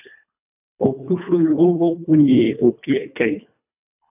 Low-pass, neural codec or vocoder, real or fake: 3.6 kHz; codec, 24 kHz, 1.5 kbps, HILCodec; fake